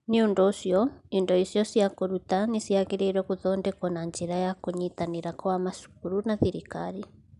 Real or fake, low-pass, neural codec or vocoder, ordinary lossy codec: real; 10.8 kHz; none; none